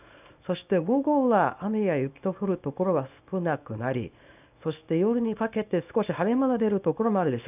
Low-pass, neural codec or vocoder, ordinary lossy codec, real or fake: 3.6 kHz; codec, 24 kHz, 0.9 kbps, WavTokenizer, small release; none; fake